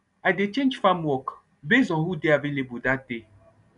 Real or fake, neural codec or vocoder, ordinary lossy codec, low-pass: real; none; none; 10.8 kHz